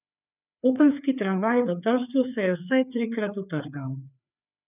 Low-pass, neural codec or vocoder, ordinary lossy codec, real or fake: 3.6 kHz; codec, 16 kHz, 4 kbps, FreqCodec, larger model; none; fake